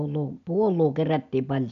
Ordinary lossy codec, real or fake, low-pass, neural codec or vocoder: MP3, 96 kbps; real; 7.2 kHz; none